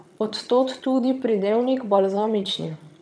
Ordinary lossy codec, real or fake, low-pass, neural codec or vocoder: none; fake; none; vocoder, 22.05 kHz, 80 mel bands, HiFi-GAN